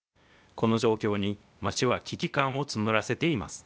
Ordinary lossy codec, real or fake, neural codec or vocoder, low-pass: none; fake; codec, 16 kHz, 0.8 kbps, ZipCodec; none